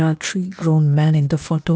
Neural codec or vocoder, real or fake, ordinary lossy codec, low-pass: codec, 16 kHz, 0.8 kbps, ZipCodec; fake; none; none